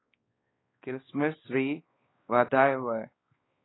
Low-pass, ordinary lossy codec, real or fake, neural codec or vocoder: 7.2 kHz; AAC, 16 kbps; fake; codec, 16 kHz, 2 kbps, X-Codec, WavLM features, trained on Multilingual LibriSpeech